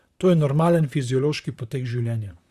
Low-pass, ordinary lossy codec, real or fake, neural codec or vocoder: 14.4 kHz; Opus, 64 kbps; fake; vocoder, 44.1 kHz, 128 mel bands, Pupu-Vocoder